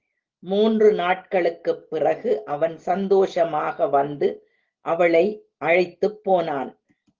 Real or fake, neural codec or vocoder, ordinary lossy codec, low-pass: real; none; Opus, 16 kbps; 7.2 kHz